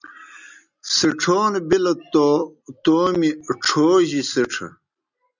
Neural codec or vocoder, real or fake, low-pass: none; real; 7.2 kHz